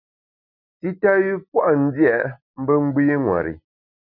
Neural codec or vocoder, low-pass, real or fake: none; 5.4 kHz; real